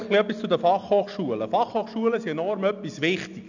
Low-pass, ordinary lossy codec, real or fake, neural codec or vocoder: 7.2 kHz; none; real; none